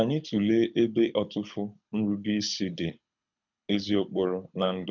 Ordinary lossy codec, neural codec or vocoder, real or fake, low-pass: none; codec, 24 kHz, 6 kbps, HILCodec; fake; 7.2 kHz